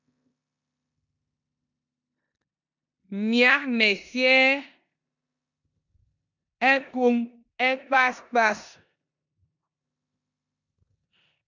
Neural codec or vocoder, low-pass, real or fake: codec, 16 kHz in and 24 kHz out, 0.9 kbps, LongCat-Audio-Codec, four codebook decoder; 7.2 kHz; fake